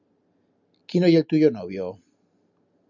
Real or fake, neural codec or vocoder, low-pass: real; none; 7.2 kHz